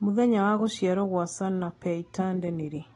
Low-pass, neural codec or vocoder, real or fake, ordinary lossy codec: 10.8 kHz; none; real; AAC, 32 kbps